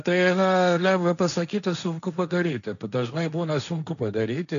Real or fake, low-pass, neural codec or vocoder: fake; 7.2 kHz; codec, 16 kHz, 1.1 kbps, Voila-Tokenizer